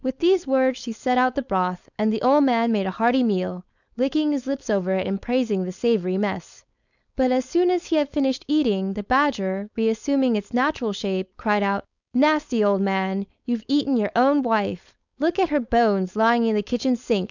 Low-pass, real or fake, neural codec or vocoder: 7.2 kHz; fake; codec, 16 kHz, 4.8 kbps, FACodec